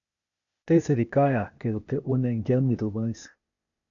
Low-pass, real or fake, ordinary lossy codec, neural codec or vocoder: 7.2 kHz; fake; MP3, 96 kbps; codec, 16 kHz, 0.8 kbps, ZipCodec